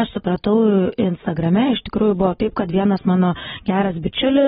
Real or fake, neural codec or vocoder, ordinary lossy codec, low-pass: real; none; AAC, 16 kbps; 7.2 kHz